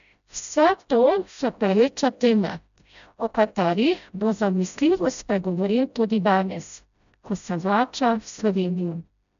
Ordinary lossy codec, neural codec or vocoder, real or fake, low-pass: none; codec, 16 kHz, 0.5 kbps, FreqCodec, smaller model; fake; 7.2 kHz